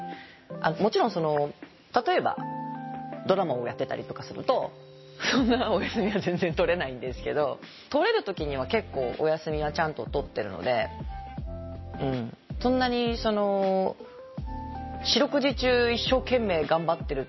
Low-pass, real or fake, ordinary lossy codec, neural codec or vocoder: 7.2 kHz; real; MP3, 24 kbps; none